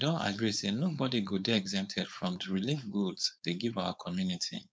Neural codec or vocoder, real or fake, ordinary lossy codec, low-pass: codec, 16 kHz, 4.8 kbps, FACodec; fake; none; none